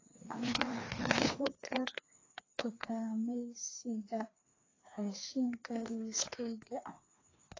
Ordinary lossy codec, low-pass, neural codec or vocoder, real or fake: AAC, 32 kbps; 7.2 kHz; codec, 16 kHz, 2 kbps, FreqCodec, larger model; fake